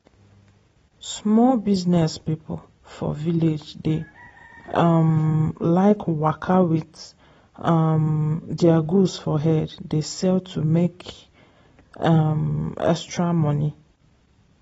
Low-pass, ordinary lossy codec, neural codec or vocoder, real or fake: 19.8 kHz; AAC, 24 kbps; none; real